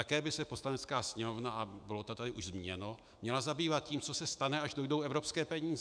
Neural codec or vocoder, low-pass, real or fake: autoencoder, 48 kHz, 128 numbers a frame, DAC-VAE, trained on Japanese speech; 9.9 kHz; fake